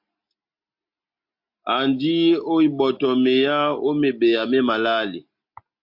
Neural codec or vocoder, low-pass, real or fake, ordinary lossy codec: none; 5.4 kHz; real; MP3, 48 kbps